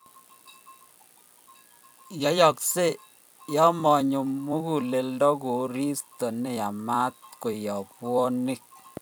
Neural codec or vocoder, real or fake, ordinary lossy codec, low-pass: vocoder, 44.1 kHz, 128 mel bands every 256 samples, BigVGAN v2; fake; none; none